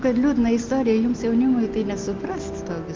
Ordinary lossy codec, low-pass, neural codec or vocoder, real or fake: Opus, 32 kbps; 7.2 kHz; none; real